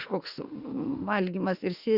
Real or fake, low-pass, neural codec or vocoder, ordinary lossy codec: fake; 5.4 kHz; autoencoder, 48 kHz, 32 numbers a frame, DAC-VAE, trained on Japanese speech; Opus, 64 kbps